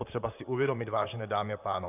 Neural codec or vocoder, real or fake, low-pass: vocoder, 44.1 kHz, 128 mel bands, Pupu-Vocoder; fake; 3.6 kHz